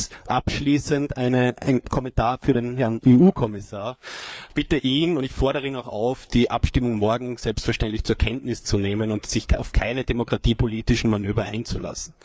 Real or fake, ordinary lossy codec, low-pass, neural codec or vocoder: fake; none; none; codec, 16 kHz, 4 kbps, FreqCodec, larger model